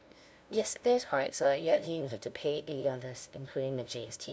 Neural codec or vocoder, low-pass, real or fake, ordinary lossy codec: codec, 16 kHz, 0.5 kbps, FunCodec, trained on LibriTTS, 25 frames a second; none; fake; none